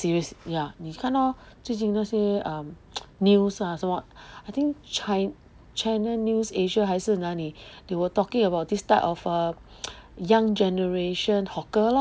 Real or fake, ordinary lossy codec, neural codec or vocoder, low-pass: real; none; none; none